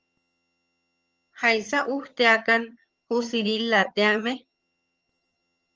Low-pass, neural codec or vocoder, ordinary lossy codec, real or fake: 7.2 kHz; vocoder, 22.05 kHz, 80 mel bands, HiFi-GAN; Opus, 24 kbps; fake